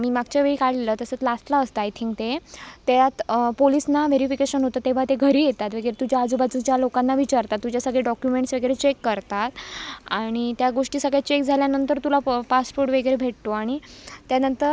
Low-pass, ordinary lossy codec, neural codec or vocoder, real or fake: none; none; none; real